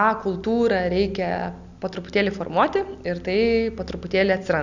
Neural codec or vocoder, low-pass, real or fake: none; 7.2 kHz; real